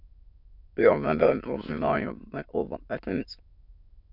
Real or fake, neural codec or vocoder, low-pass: fake; autoencoder, 22.05 kHz, a latent of 192 numbers a frame, VITS, trained on many speakers; 5.4 kHz